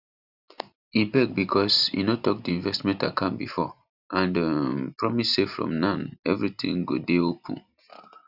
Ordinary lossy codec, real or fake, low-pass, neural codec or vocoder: none; real; 5.4 kHz; none